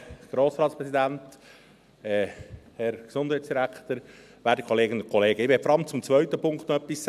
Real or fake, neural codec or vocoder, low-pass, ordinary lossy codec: real; none; 14.4 kHz; none